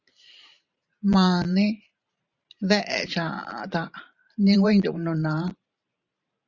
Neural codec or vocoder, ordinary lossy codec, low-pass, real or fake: vocoder, 44.1 kHz, 128 mel bands every 512 samples, BigVGAN v2; Opus, 64 kbps; 7.2 kHz; fake